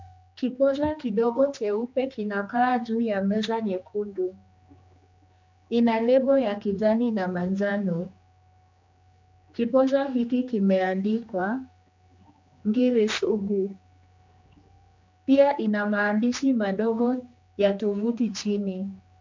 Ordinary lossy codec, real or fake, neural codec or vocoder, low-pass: MP3, 64 kbps; fake; codec, 16 kHz, 2 kbps, X-Codec, HuBERT features, trained on general audio; 7.2 kHz